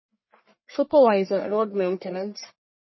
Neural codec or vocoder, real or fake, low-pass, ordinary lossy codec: codec, 44.1 kHz, 1.7 kbps, Pupu-Codec; fake; 7.2 kHz; MP3, 24 kbps